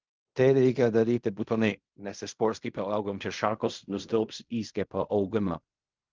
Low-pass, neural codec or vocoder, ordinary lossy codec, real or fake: 7.2 kHz; codec, 16 kHz in and 24 kHz out, 0.4 kbps, LongCat-Audio-Codec, fine tuned four codebook decoder; Opus, 24 kbps; fake